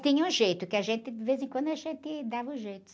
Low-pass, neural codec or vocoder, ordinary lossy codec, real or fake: none; none; none; real